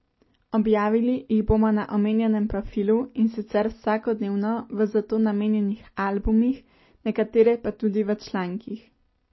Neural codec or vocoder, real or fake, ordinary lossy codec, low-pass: none; real; MP3, 24 kbps; 7.2 kHz